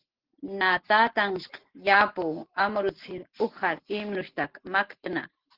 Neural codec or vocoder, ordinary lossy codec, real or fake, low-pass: none; Opus, 16 kbps; real; 5.4 kHz